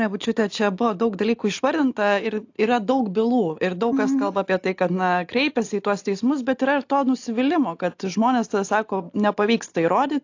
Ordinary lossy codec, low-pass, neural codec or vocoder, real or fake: AAC, 48 kbps; 7.2 kHz; none; real